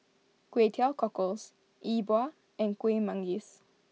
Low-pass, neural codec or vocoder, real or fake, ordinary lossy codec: none; none; real; none